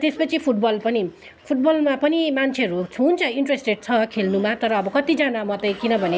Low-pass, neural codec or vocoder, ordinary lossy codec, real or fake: none; none; none; real